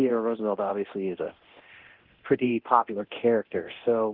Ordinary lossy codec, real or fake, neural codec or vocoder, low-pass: Opus, 16 kbps; fake; codec, 24 kHz, 0.9 kbps, DualCodec; 5.4 kHz